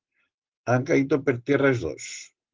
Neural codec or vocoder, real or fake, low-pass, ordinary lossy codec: none; real; 7.2 kHz; Opus, 16 kbps